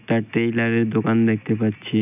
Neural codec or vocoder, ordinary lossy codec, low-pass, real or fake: none; none; 3.6 kHz; real